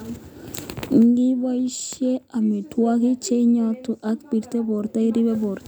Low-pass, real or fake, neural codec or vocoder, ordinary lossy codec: none; real; none; none